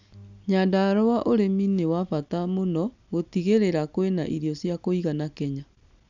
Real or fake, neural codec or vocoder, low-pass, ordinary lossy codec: real; none; 7.2 kHz; none